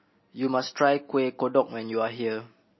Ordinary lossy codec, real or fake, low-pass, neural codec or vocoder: MP3, 24 kbps; real; 7.2 kHz; none